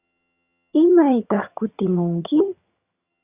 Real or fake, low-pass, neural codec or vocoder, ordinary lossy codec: fake; 3.6 kHz; vocoder, 22.05 kHz, 80 mel bands, HiFi-GAN; Opus, 64 kbps